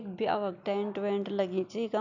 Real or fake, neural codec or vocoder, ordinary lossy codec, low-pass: real; none; none; 7.2 kHz